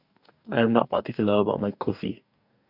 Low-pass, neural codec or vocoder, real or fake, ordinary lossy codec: 5.4 kHz; codec, 44.1 kHz, 2.6 kbps, DAC; fake; none